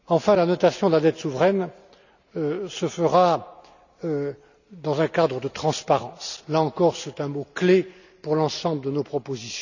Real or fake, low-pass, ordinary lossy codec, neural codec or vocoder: real; 7.2 kHz; none; none